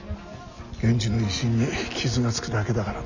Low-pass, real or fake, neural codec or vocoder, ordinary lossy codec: 7.2 kHz; real; none; none